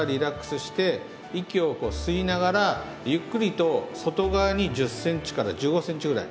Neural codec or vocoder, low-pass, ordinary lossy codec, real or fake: none; none; none; real